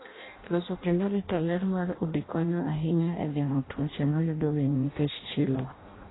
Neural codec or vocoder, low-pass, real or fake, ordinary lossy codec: codec, 16 kHz in and 24 kHz out, 0.6 kbps, FireRedTTS-2 codec; 7.2 kHz; fake; AAC, 16 kbps